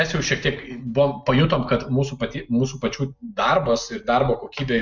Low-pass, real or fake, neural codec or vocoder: 7.2 kHz; real; none